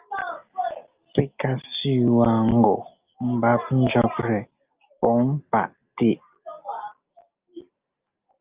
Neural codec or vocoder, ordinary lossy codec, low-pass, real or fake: none; Opus, 24 kbps; 3.6 kHz; real